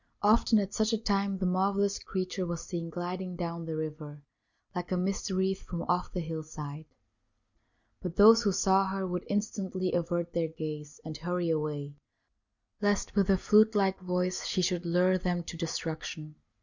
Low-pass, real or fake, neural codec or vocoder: 7.2 kHz; real; none